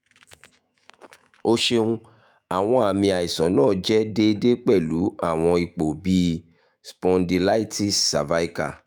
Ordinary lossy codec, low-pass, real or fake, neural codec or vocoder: none; none; fake; autoencoder, 48 kHz, 128 numbers a frame, DAC-VAE, trained on Japanese speech